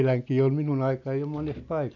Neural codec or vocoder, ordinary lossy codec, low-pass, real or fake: none; none; 7.2 kHz; real